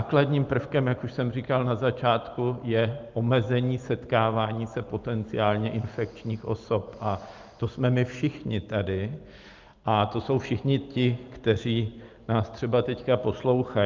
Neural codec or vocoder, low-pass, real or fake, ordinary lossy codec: none; 7.2 kHz; real; Opus, 32 kbps